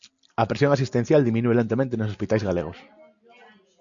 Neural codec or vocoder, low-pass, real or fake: none; 7.2 kHz; real